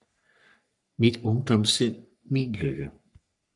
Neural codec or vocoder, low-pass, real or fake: codec, 44.1 kHz, 3.4 kbps, Pupu-Codec; 10.8 kHz; fake